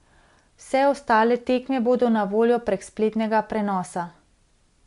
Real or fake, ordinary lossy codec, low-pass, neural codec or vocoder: real; MP3, 64 kbps; 10.8 kHz; none